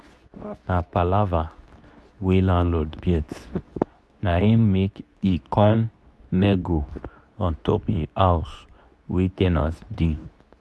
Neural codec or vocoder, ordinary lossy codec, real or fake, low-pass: codec, 24 kHz, 0.9 kbps, WavTokenizer, medium speech release version 2; none; fake; none